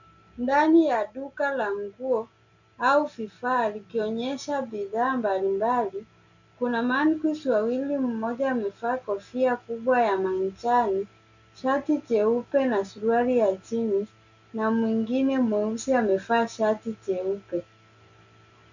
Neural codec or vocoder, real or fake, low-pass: none; real; 7.2 kHz